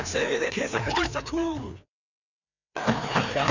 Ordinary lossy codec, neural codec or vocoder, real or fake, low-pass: none; codec, 16 kHz, 2 kbps, FreqCodec, larger model; fake; 7.2 kHz